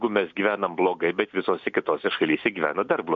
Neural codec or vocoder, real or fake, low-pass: none; real; 7.2 kHz